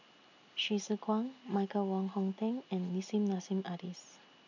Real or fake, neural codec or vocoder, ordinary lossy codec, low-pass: real; none; none; 7.2 kHz